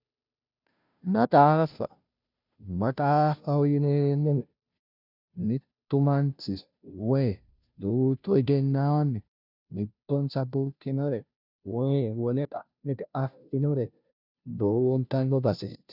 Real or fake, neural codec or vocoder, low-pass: fake; codec, 16 kHz, 0.5 kbps, FunCodec, trained on Chinese and English, 25 frames a second; 5.4 kHz